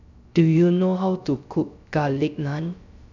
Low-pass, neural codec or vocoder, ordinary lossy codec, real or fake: 7.2 kHz; codec, 16 kHz, 0.3 kbps, FocalCodec; none; fake